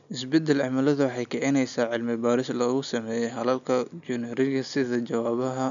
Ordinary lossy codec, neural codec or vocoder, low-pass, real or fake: none; none; 7.2 kHz; real